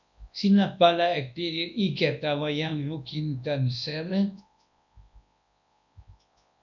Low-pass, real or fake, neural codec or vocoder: 7.2 kHz; fake; codec, 24 kHz, 0.9 kbps, WavTokenizer, large speech release